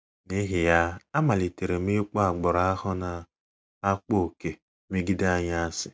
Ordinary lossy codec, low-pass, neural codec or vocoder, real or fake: none; none; none; real